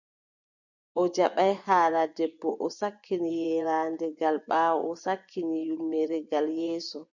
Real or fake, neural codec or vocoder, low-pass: fake; vocoder, 24 kHz, 100 mel bands, Vocos; 7.2 kHz